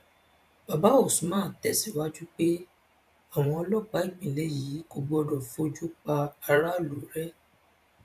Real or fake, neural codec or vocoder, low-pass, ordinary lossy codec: fake; vocoder, 44.1 kHz, 128 mel bands every 512 samples, BigVGAN v2; 14.4 kHz; MP3, 96 kbps